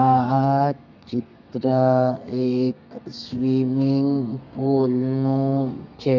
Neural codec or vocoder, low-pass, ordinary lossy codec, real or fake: codec, 32 kHz, 1.9 kbps, SNAC; 7.2 kHz; none; fake